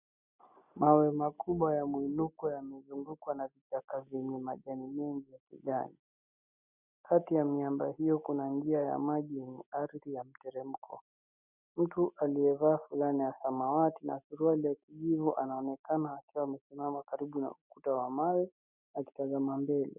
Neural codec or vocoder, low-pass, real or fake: none; 3.6 kHz; real